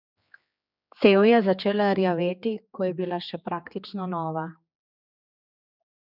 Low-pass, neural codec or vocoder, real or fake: 5.4 kHz; codec, 16 kHz, 4 kbps, X-Codec, HuBERT features, trained on general audio; fake